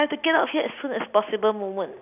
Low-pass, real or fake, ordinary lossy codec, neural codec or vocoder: 3.6 kHz; real; none; none